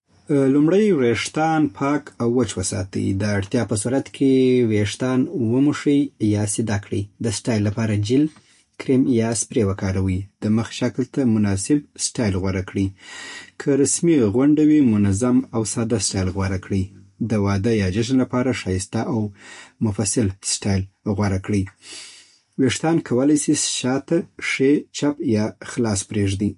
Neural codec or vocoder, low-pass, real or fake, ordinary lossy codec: none; 10.8 kHz; real; MP3, 48 kbps